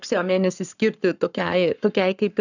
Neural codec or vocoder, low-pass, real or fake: codec, 44.1 kHz, 7.8 kbps, Pupu-Codec; 7.2 kHz; fake